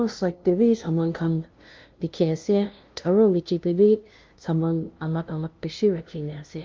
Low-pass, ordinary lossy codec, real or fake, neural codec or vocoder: 7.2 kHz; Opus, 32 kbps; fake; codec, 16 kHz, 0.5 kbps, FunCodec, trained on LibriTTS, 25 frames a second